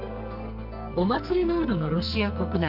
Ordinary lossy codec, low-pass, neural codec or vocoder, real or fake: none; 5.4 kHz; codec, 44.1 kHz, 2.6 kbps, SNAC; fake